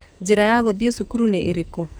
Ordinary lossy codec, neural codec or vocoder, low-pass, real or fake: none; codec, 44.1 kHz, 2.6 kbps, SNAC; none; fake